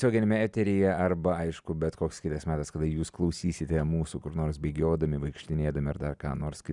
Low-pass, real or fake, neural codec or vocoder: 10.8 kHz; real; none